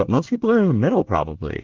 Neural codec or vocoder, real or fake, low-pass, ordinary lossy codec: codec, 24 kHz, 1 kbps, SNAC; fake; 7.2 kHz; Opus, 16 kbps